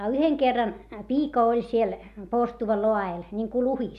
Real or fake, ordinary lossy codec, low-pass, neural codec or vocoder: real; none; 14.4 kHz; none